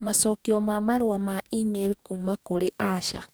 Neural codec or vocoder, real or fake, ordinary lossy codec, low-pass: codec, 44.1 kHz, 2.6 kbps, DAC; fake; none; none